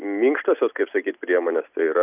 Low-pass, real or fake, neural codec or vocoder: 3.6 kHz; fake; vocoder, 44.1 kHz, 128 mel bands every 256 samples, BigVGAN v2